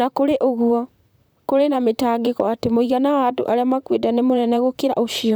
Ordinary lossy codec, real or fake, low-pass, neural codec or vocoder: none; fake; none; vocoder, 44.1 kHz, 128 mel bands, Pupu-Vocoder